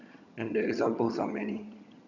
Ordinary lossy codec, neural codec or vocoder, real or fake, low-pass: none; vocoder, 22.05 kHz, 80 mel bands, HiFi-GAN; fake; 7.2 kHz